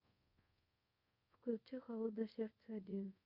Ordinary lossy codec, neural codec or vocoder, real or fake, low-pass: Opus, 64 kbps; codec, 24 kHz, 0.5 kbps, DualCodec; fake; 5.4 kHz